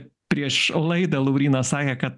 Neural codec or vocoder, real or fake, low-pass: none; real; 10.8 kHz